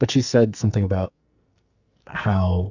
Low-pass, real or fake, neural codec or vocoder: 7.2 kHz; fake; codec, 44.1 kHz, 2.6 kbps, SNAC